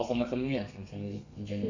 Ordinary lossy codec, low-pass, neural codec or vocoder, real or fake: none; 7.2 kHz; codec, 32 kHz, 1.9 kbps, SNAC; fake